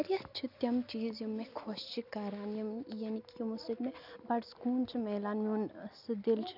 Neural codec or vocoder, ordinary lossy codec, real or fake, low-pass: none; none; real; 5.4 kHz